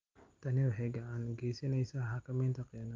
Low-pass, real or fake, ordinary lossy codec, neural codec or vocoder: 7.2 kHz; real; Opus, 32 kbps; none